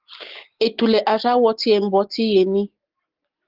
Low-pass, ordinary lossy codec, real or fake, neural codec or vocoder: 5.4 kHz; Opus, 16 kbps; real; none